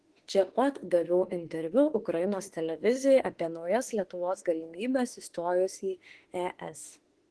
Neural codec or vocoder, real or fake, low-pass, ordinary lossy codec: autoencoder, 48 kHz, 32 numbers a frame, DAC-VAE, trained on Japanese speech; fake; 10.8 kHz; Opus, 16 kbps